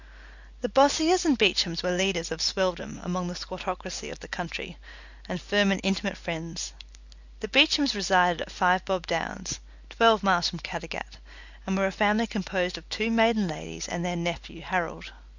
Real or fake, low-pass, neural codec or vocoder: real; 7.2 kHz; none